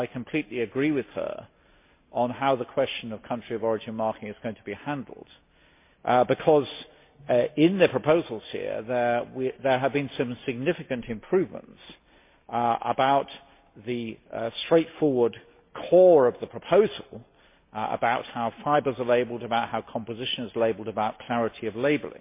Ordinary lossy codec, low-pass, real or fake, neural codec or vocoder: MP3, 24 kbps; 3.6 kHz; real; none